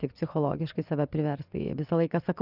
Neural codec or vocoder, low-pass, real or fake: vocoder, 24 kHz, 100 mel bands, Vocos; 5.4 kHz; fake